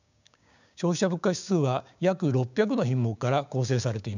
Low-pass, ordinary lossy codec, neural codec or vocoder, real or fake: 7.2 kHz; none; codec, 16 kHz, 6 kbps, DAC; fake